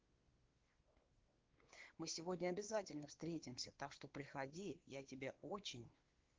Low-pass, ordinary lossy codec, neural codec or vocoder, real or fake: 7.2 kHz; Opus, 16 kbps; codec, 16 kHz, 4 kbps, X-Codec, WavLM features, trained on Multilingual LibriSpeech; fake